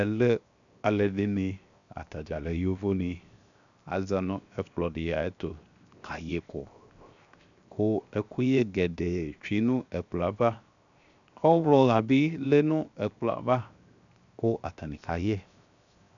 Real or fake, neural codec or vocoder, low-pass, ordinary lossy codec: fake; codec, 16 kHz, 0.7 kbps, FocalCodec; 7.2 kHz; MP3, 96 kbps